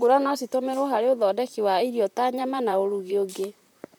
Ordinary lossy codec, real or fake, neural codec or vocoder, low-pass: none; fake; vocoder, 44.1 kHz, 128 mel bands, Pupu-Vocoder; 19.8 kHz